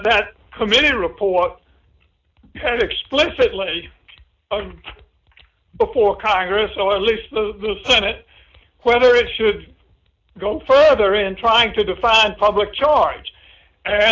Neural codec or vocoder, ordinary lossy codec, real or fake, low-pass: none; AAC, 48 kbps; real; 7.2 kHz